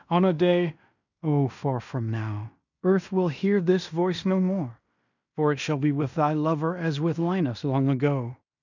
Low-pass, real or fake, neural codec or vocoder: 7.2 kHz; fake; codec, 16 kHz in and 24 kHz out, 0.9 kbps, LongCat-Audio-Codec, fine tuned four codebook decoder